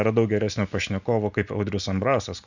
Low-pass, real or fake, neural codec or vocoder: 7.2 kHz; real; none